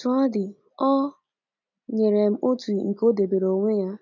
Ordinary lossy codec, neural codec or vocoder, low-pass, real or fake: none; none; 7.2 kHz; real